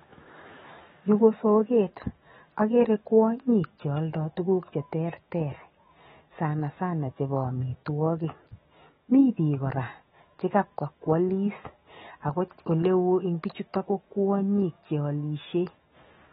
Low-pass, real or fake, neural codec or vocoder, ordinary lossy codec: 19.8 kHz; fake; autoencoder, 48 kHz, 128 numbers a frame, DAC-VAE, trained on Japanese speech; AAC, 16 kbps